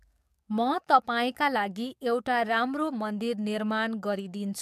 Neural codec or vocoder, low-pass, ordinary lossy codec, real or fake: codec, 44.1 kHz, 7.8 kbps, DAC; 14.4 kHz; MP3, 96 kbps; fake